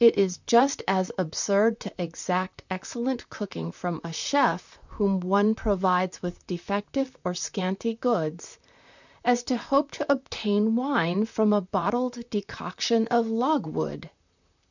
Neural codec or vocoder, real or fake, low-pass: vocoder, 44.1 kHz, 128 mel bands, Pupu-Vocoder; fake; 7.2 kHz